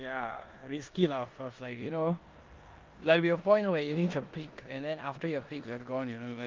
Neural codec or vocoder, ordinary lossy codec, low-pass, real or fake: codec, 16 kHz in and 24 kHz out, 0.9 kbps, LongCat-Audio-Codec, four codebook decoder; Opus, 32 kbps; 7.2 kHz; fake